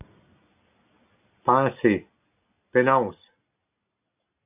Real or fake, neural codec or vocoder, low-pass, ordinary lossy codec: real; none; 3.6 kHz; AAC, 32 kbps